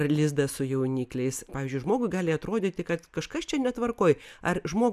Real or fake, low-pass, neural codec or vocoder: real; 14.4 kHz; none